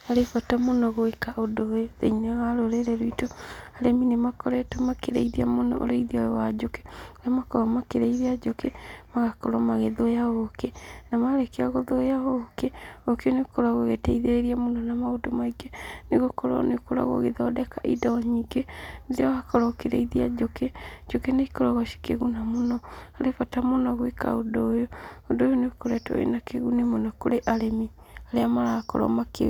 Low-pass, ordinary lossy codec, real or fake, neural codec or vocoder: 19.8 kHz; none; real; none